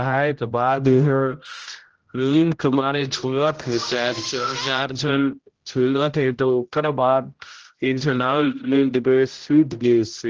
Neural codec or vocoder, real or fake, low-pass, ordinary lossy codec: codec, 16 kHz, 0.5 kbps, X-Codec, HuBERT features, trained on general audio; fake; 7.2 kHz; Opus, 16 kbps